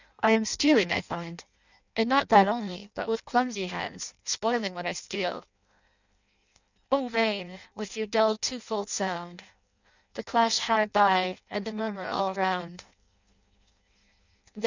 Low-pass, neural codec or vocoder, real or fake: 7.2 kHz; codec, 16 kHz in and 24 kHz out, 0.6 kbps, FireRedTTS-2 codec; fake